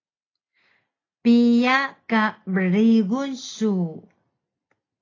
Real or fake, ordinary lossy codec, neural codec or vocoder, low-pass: real; AAC, 32 kbps; none; 7.2 kHz